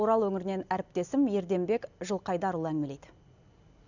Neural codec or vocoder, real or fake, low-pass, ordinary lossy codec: none; real; 7.2 kHz; none